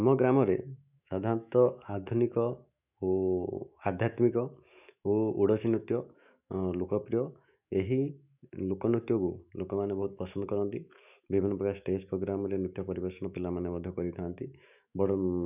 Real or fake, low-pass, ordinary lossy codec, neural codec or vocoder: fake; 3.6 kHz; none; autoencoder, 48 kHz, 128 numbers a frame, DAC-VAE, trained on Japanese speech